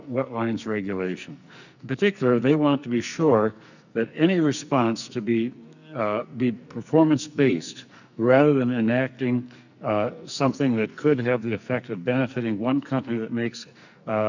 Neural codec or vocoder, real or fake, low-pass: codec, 44.1 kHz, 2.6 kbps, SNAC; fake; 7.2 kHz